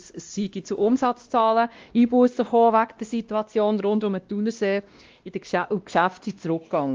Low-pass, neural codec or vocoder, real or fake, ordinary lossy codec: 7.2 kHz; codec, 16 kHz, 1 kbps, X-Codec, WavLM features, trained on Multilingual LibriSpeech; fake; Opus, 32 kbps